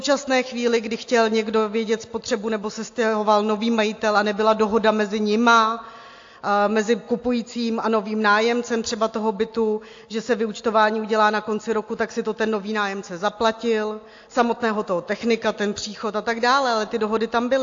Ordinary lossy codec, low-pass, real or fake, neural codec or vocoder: AAC, 48 kbps; 7.2 kHz; real; none